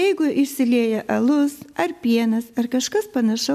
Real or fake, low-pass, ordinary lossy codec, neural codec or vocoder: real; 14.4 kHz; MP3, 96 kbps; none